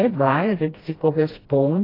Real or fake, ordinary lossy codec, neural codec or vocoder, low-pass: fake; AAC, 24 kbps; codec, 16 kHz, 1 kbps, FreqCodec, smaller model; 5.4 kHz